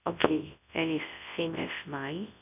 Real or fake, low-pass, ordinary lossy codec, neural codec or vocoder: fake; 3.6 kHz; none; codec, 24 kHz, 0.9 kbps, WavTokenizer, large speech release